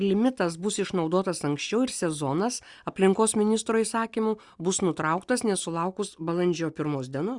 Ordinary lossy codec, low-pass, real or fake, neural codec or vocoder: Opus, 64 kbps; 10.8 kHz; real; none